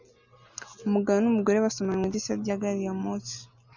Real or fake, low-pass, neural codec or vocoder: real; 7.2 kHz; none